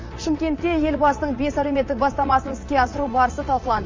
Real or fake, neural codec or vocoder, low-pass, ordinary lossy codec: fake; vocoder, 44.1 kHz, 80 mel bands, Vocos; 7.2 kHz; MP3, 32 kbps